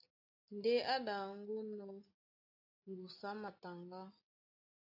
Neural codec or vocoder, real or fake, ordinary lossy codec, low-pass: none; real; AAC, 48 kbps; 5.4 kHz